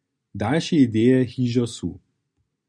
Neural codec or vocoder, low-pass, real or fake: none; 9.9 kHz; real